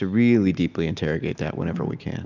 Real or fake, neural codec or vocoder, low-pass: real; none; 7.2 kHz